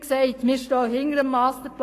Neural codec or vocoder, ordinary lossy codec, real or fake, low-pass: codec, 44.1 kHz, 7.8 kbps, Pupu-Codec; AAC, 48 kbps; fake; 14.4 kHz